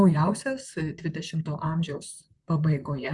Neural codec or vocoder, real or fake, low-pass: vocoder, 44.1 kHz, 128 mel bands, Pupu-Vocoder; fake; 10.8 kHz